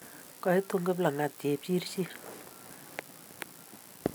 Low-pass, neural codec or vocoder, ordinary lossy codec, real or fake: none; none; none; real